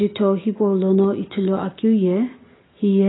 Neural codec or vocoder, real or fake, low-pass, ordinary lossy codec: none; real; 7.2 kHz; AAC, 16 kbps